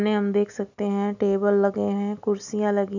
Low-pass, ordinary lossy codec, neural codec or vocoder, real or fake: 7.2 kHz; none; none; real